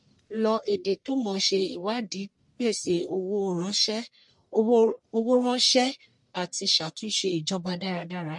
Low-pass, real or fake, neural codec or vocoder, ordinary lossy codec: 10.8 kHz; fake; codec, 44.1 kHz, 1.7 kbps, Pupu-Codec; MP3, 48 kbps